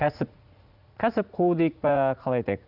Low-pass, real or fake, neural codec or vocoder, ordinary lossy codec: 5.4 kHz; fake; vocoder, 44.1 kHz, 80 mel bands, Vocos; none